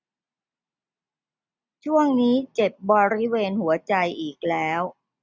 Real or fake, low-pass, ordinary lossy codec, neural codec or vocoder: real; none; none; none